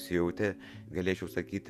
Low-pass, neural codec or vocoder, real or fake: 14.4 kHz; vocoder, 48 kHz, 128 mel bands, Vocos; fake